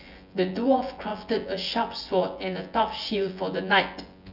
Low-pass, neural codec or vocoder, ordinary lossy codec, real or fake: 5.4 kHz; vocoder, 24 kHz, 100 mel bands, Vocos; none; fake